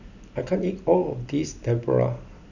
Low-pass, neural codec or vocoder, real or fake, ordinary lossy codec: 7.2 kHz; none; real; none